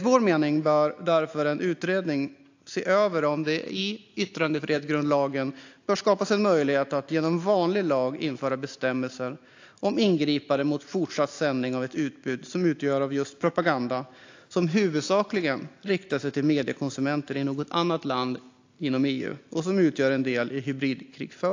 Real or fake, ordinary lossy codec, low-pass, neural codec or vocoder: real; AAC, 48 kbps; 7.2 kHz; none